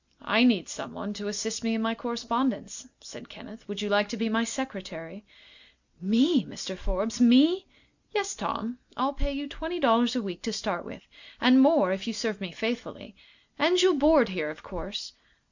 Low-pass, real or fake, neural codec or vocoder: 7.2 kHz; real; none